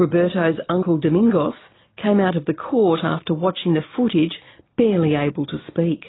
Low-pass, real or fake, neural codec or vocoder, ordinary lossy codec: 7.2 kHz; real; none; AAC, 16 kbps